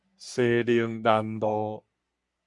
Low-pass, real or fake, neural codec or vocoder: 10.8 kHz; fake; codec, 44.1 kHz, 3.4 kbps, Pupu-Codec